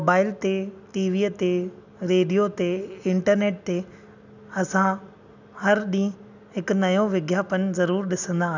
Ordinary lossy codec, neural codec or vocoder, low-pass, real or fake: none; none; 7.2 kHz; real